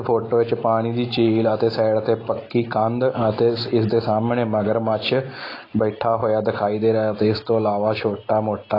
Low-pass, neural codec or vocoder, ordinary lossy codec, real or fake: 5.4 kHz; none; AAC, 24 kbps; real